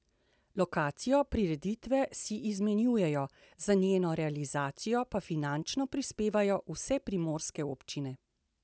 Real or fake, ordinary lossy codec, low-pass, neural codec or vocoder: real; none; none; none